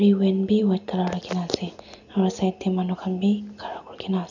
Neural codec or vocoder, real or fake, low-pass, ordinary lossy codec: none; real; 7.2 kHz; none